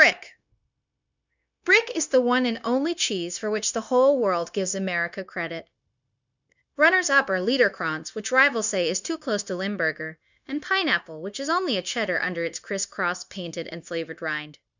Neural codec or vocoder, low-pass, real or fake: codec, 16 kHz, 0.9 kbps, LongCat-Audio-Codec; 7.2 kHz; fake